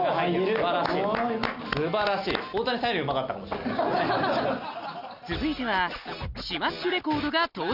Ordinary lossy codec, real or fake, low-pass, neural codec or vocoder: none; real; 5.4 kHz; none